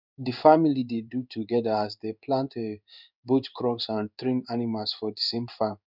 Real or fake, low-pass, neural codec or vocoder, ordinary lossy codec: fake; 5.4 kHz; codec, 16 kHz in and 24 kHz out, 1 kbps, XY-Tokenizer; none